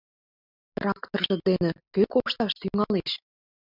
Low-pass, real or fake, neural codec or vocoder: 5.4 kHz; real; none